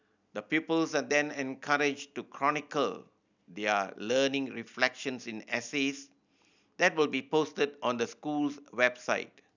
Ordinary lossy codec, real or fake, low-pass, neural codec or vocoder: none; real; 7.2 kHz; none